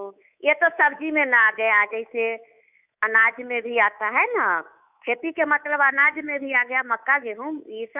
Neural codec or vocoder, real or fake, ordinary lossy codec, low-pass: codec, 24 kHz, 3.1 kbps, DualCodec; fake; none; 3.6 kHz